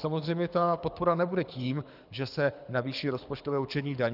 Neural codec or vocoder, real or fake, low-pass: codec, 24 kHz, 6 kbps, HILCodec; fake; 5.4 kHz